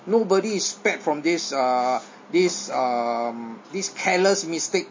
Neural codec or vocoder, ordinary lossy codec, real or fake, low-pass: none; MP3, 32 kbps; real; 7.2 kHz